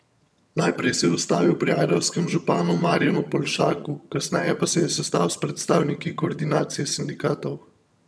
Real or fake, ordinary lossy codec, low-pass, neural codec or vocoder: fake; none; none; vocoder, 22.05 kHz, 80 mel bands, HiFi-GAN